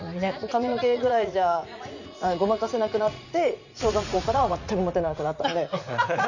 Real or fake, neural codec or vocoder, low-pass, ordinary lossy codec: fake; vocoder, 44.1 kHz, 80 mel bands, Vocos; 7.2 kHz; none